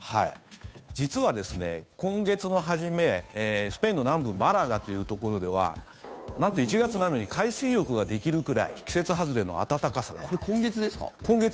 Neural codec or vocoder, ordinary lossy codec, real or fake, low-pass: codec, 16 kHz, 2 kbps, FunCodec, trained on Chinese and English, 25 frames a second; none; fake; none